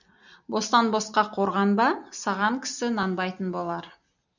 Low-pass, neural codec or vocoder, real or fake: 7.2 kHz; none; real